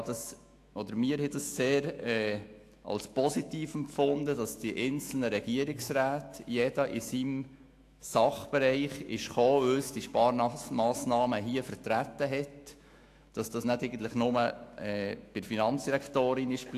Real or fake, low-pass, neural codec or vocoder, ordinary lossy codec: fake; 14.4 kHz; autoencoder, 48 kHz, 128 numbers a frame, DAC-VAE, trained on Japanese speech; AAC, 64 kbps